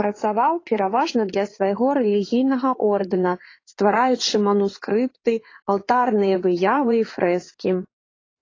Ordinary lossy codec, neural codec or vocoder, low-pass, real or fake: AAC, 32 kbps; codec, 16 kHz in and 24 kHz out, 2.2 kbps, FireRedTTS-2 codec; 7.2 kHz; fake